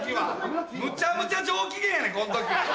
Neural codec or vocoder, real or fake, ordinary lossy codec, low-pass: none; real; none; none